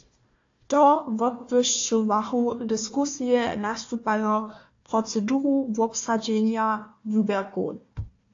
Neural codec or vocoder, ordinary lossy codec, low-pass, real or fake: codec, 16 kHz, 1 kbps, FunCodec, trained on Chinese and English, 50 frames a second; AAC, 48 kbps; 7.2 kHz; fake